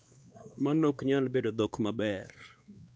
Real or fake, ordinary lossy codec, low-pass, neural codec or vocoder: fake; none; none; codec, 16 kHz, 2 kbps, X-Codec, WavLM features, trained on Multilingual LibriSpeech